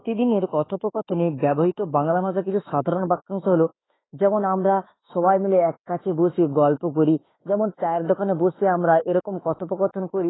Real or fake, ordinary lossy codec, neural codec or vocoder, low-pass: fake; AAC, 16 kbps; autoencoder, 48 kHz, 32 numbers a frame, DAC-VAE, trained on Japanese speech; 7.2 kHz